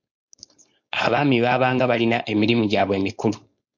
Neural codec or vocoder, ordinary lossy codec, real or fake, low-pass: codec, 16 kHz, 4.8 kbps, FACodec; MP3, 48 kbps; fake; 7.2 kHz